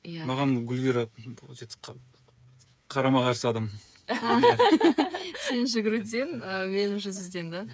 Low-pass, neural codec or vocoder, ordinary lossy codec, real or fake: none; codec, 16 kHz, 8 kbps, FreqCodec, smaller model; none; fake